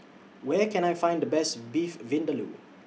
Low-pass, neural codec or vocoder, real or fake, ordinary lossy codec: none; none; real; none